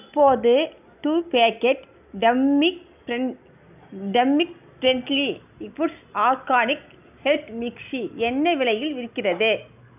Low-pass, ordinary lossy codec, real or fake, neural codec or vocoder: 3.6 kHz; none; real; none